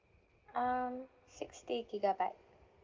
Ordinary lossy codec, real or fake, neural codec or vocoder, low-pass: Opus, 16 kbps; real; none; 7.2 kHz